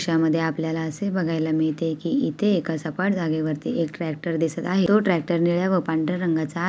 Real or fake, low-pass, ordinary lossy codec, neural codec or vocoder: real; none; none; none